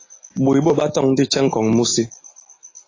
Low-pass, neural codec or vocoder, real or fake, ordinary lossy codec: 7.2 kHz; none; real; AAC, 32 kbps